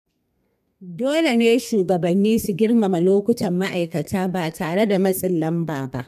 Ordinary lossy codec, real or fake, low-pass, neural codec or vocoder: none; fake; 14.4 kHz; codec, 32 kHz, 1.9 kbps, SNAC